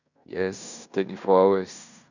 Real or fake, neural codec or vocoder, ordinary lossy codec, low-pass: fake; codec, 16 kHz in and 24 kHz out, 0.9 kbps, LongCat-Audio-Codec, fine tuned four codebook decoder; none; 7.2 kHz